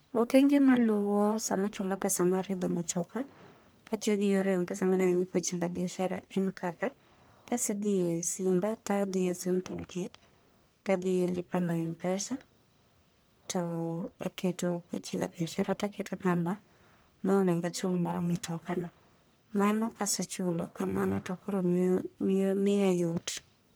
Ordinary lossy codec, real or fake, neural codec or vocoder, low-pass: none; fake; codec, 44.1 kHz, 1.7 kbps, Pupu-Codec; none